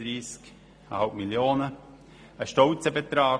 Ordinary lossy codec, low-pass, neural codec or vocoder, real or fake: none; none; none; real